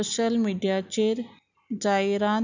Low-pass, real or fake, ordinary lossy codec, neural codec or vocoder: 7.2 kHz; real; none; none